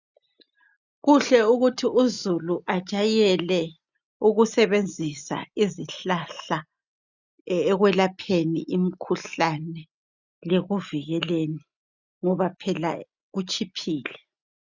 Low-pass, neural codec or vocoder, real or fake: 7.2 kHz; none; real